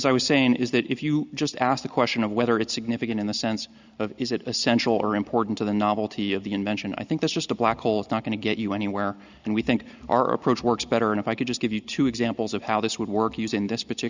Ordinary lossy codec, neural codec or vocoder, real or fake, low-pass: Opus, 64 kbps; none; real; 7.2 kHz